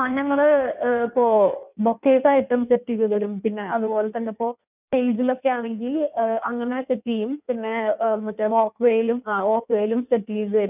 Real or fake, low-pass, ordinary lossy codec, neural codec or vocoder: fake; 3.6 kHz; none; codec, 16 kHz in and 24 kHz out, 1.1 kbps, FireRedTTS-2 codec